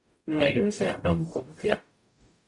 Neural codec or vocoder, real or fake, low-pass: codec, 44.1 kHz, 0.9 kbps, DAC; fake; 10.8 kHz